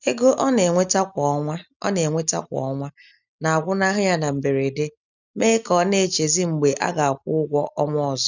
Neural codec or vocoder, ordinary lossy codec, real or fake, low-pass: none; none; real; 7.2 kHz